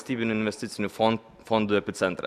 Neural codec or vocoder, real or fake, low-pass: none; real; 14.4 kHz